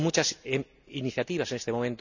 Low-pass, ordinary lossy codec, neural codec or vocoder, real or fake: 7.2 kHz; none; none; real